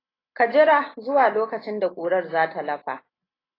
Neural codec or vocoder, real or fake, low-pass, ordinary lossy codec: none; real; 5.4 kHz; AAC, 24 kbps